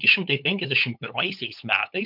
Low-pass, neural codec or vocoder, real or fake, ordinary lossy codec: 5.4 kHz; codec, 16 kHz, 8 kbps, FunCodec, trained on LibriTTS, 25 frames a second; fake; MP3, 48 kbps